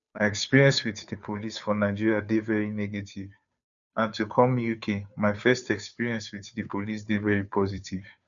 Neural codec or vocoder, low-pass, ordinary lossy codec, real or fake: codec, 16 kHz, 2 kbps, FunCodec, trained on Chinese and English, 25 frames a second; 7.2 kHz; none; fake